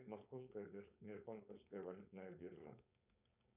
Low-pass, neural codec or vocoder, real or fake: 3.6 kHz; codec, 16 kHz, 4.8 kbps, FACodec; fake